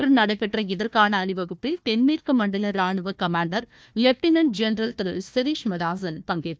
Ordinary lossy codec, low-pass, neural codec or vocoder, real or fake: none; none; codec, 16 kHz, 1 kbps, FunCodec, trained on Chinese and English, 50 frames a second; fake